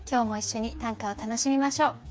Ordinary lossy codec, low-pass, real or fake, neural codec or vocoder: none; none; fake; codec, 16 kHz, 4 kbps, FreqCodec, smaller model